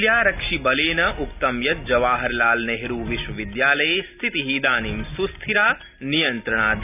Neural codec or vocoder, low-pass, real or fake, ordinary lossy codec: none; 3.6 kHz; real; none